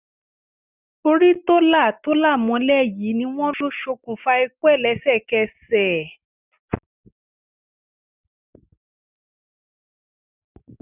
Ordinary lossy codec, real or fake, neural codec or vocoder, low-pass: none; real; none; 3.6 kHz